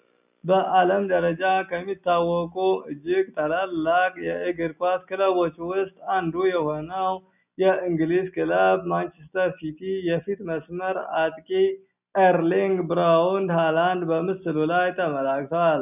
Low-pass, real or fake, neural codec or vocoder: 3.6 kHz; real; none